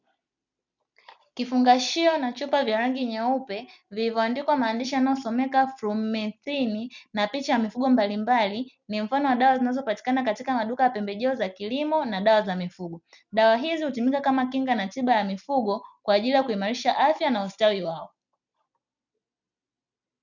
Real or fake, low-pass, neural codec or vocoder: real; 7.2 kHz; none